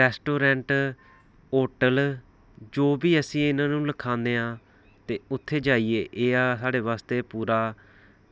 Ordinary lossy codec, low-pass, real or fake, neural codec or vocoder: none; none; real; none